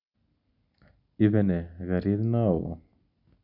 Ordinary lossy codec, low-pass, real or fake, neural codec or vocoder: none; 5.4 kHz; real; none